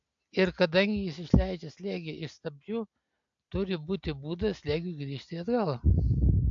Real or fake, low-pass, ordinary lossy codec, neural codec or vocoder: real; 7.2 kHz; AAC, 64 kbps; none